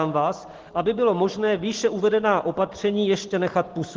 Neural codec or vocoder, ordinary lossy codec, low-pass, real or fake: none; Opus, 16 kbps; 7.2 kHz; real